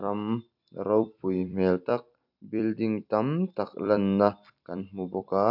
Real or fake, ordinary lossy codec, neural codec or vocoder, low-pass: fake; none; vocoder, 44.1 kHz, 128 mel bands every 256 samples, BigVGAN v2; 5.4 kHz